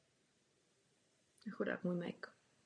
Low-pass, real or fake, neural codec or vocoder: 9.9 kHz; real; none